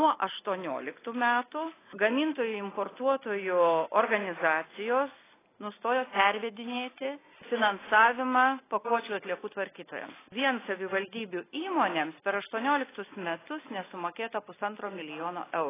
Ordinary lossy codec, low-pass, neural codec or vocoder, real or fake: AAC, 16 kbps; 3.6 kHz; none; real